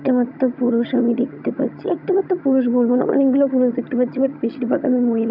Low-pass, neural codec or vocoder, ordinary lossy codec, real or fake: 5.4 kHz; vocoder, 22.05 kHz, 80 mel bands, HiFi-GAN; none; fake